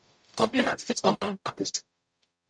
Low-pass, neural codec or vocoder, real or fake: 9.9 kHz; codec, 44.1 kHz, 0.9 kbps, DAC; fake